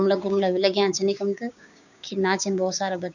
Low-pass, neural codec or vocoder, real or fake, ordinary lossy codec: 7.2 kHz; codec, 16 kHz, 6 kbps, DAC; fake; none